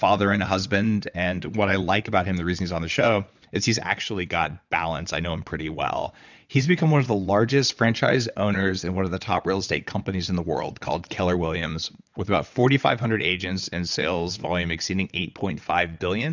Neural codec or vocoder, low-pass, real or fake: vocoder, 22.05 kHz, 80 mel bands, WaveNeXt; 7.2 kHz; fake